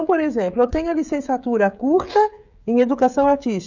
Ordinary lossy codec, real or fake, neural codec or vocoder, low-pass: none; fake; codec, 16 kHz, 16 kbps, FreqCodec, smaller model; 7.2 kHz